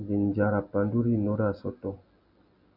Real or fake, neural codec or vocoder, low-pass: real; none; 5.4 kHz